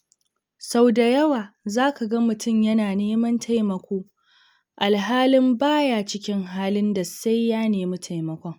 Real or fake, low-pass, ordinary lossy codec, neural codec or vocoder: real; none; none; none